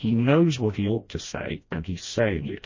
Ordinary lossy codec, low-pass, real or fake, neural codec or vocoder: MP3, 32 kbps; 7.2 kHz; fake; codec, 16 kHz, 1 kbps, FreqCodec, smaller model